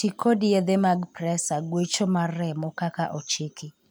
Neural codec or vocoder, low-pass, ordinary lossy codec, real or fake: none; none; none; real